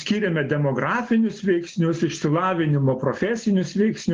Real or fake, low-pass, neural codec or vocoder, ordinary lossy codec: real; 7.2 kHz; none; Opus, 16 kbps